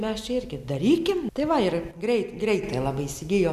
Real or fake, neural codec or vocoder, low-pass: real; none; 14.4 kHz